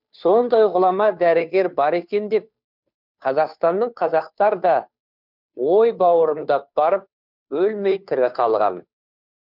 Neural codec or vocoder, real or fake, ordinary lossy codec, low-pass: codec, 16 kHz, 2 kbps, FunCodec, trained on Chinese and English, 25 frames a second; fake; none; 5.4 kHz